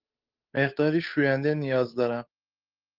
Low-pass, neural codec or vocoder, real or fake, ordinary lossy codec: 5.4 kHz; codec, 16 kHz, 8 kbps, FunCodec, trained on Chinese and English, 25 frames a second; fake; Opus, 24 kbps